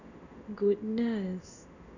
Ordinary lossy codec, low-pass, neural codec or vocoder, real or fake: none; 7.2 kHz; codec, 16 kHz in and 24 kHz out, 1 kbps, XY-Tokenizer; fake